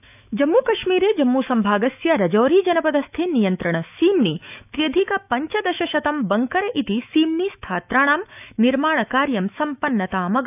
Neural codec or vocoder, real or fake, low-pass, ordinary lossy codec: autoencoder, 48 kHz, 128 numbers a frame, DAC-VAE, trained on Japanese speech; fake; 3.6 kHz; none